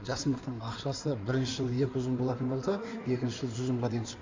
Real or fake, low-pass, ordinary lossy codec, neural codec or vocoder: fake; 7.2 kHz; MP3, 64 kbps; codec, 16 kHz in and 24 kHz out, 2.2 kbps, FireRedTTS-2 codec